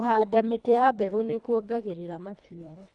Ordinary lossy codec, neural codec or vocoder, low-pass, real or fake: none; codec, 24 kHz, 1.5 kbps, HILCodec; 10.8 kHz; fake